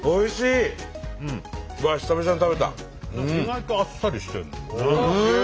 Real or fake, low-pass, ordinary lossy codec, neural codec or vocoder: real; none; none; none